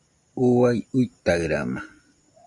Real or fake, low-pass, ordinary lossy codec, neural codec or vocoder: real; 10.8 kHz; MP3, 96 kbps; none